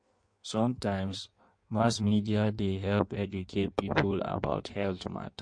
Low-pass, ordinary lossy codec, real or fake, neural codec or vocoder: 9.9 kHz; MP3, 48 kbps; fake; codec, 16 kHz in and 24 kHz out, 1.1 kbps, FireRedTTS-2 codec